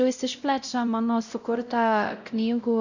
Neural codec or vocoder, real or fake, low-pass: codec, 16 kHz, 0.5 kbps, X-Codec, WavLM features, trained on Multilingual LibriSpeech; fake; 7.2 kHz